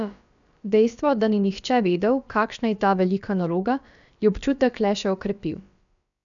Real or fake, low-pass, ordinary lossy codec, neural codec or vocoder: fake; 7.2 kHz; none; codec, 16 kHz, about 1 kbps, DyCAST, with the encoder's durations